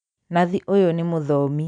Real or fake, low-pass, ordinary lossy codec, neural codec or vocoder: real; 9.9 kHz; none; none